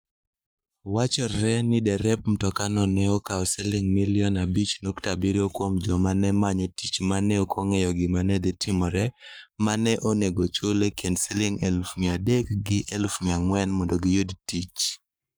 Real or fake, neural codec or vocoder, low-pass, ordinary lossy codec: fake; codec, 44.1 kHz, 7.8 kbps, Pupu-Codec; none; none